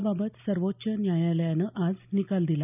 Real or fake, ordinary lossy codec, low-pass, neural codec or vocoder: real; none; 3.6 kHz; none